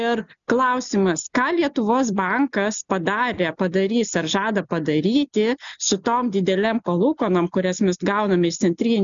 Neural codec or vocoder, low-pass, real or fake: none; 7.2 kHz; real